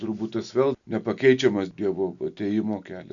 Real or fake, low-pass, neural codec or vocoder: real; 7.2 kHz; none